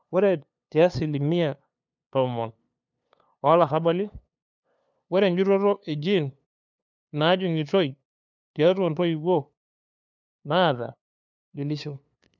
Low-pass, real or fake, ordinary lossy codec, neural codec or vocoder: 7.2 kHz; fake; none; codec, 16 kHz, 2 kbps, FunCodec, trained on LibriTTS, 25 frames a second